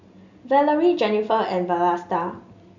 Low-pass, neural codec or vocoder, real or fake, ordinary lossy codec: 7.2 kHz; none; real; none